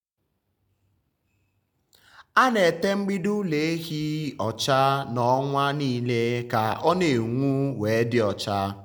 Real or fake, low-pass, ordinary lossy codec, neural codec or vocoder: real; none; none; none